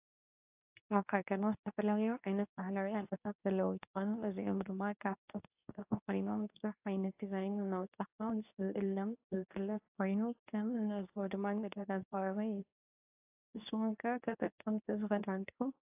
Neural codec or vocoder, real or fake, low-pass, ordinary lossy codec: codec, 24 kHz, 0.9 kbps, WavTokenizer, medium speech release version 2; fake; 3.6 kHz; AAC, 32 kbps